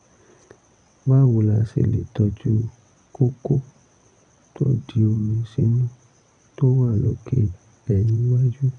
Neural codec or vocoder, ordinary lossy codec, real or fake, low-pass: vocoder, 22.05 kHz, 80 mel bands, WaveNeXt; MP3, 96 kbps; fake; 9.9 kHz